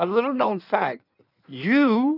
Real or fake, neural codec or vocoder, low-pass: fake; codec, 16 kHz in and 24 kHz out, 1.1 kbps, FireRedTTS-2 codec; 5.4 kHz